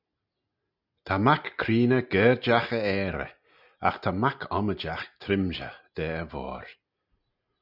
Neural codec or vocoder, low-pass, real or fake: none; 5.4 kHz; real